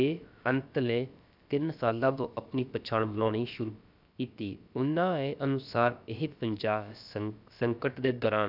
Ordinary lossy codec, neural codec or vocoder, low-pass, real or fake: none; codec, 16 kHz, about 1 kbps, DyCAST, with the encoder's durations; 5.4 kHz; fake